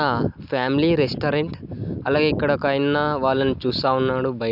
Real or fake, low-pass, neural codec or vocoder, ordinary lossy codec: real; 5.4 kHz; none; none